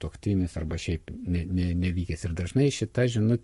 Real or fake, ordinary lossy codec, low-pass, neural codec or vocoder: fake; MP3, 48 kbps; 14.4 kHz; codec, 44.1 kHz, 7.8 kbps, Pupu-Codec